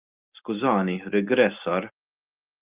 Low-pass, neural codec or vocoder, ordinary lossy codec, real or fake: 3.6 kHz; none; Opus, 32 kbps; real